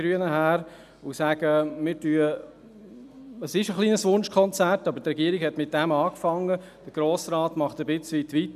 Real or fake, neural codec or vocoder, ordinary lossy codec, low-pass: real; none; none; 14.4 kHz